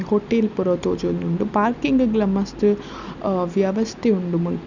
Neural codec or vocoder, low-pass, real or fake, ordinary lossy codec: none; 7.2 kHz; real; none